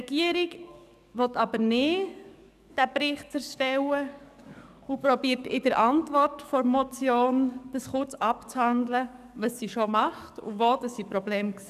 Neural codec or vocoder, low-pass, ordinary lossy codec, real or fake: codec, 44.1 kHz, 7.8 kbps, DAC; 14.4 kHz; none; fake